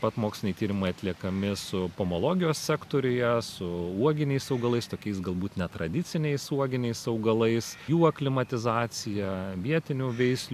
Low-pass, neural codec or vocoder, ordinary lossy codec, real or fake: 14.4 kHz; none; AAC, 96 kbps; real